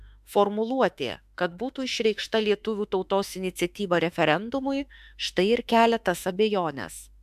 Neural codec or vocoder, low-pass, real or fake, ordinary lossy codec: autoencoder, 48 kHz, 32 numbers a frame, DAC-VAE, trained on Japanese speech; 14.4 kHz; fake; AAC, 96 kbps